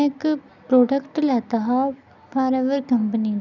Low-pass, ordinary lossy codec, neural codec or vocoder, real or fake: 7.2 kHz; none; none; real